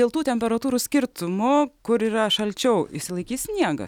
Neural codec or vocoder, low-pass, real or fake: none; 19.8 kHz; real